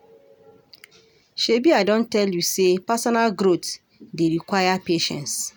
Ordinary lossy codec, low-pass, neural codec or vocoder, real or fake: none; none; none; real